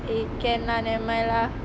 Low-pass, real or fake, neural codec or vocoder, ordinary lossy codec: none; real; none; none